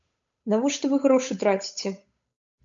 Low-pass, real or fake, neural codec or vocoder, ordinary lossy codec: 7.2 kHz; fake; codec, 16 kHz, 8 kbps, FunCodec, trained on Chinese and English, 25 frames a second; MP3, 64 kbps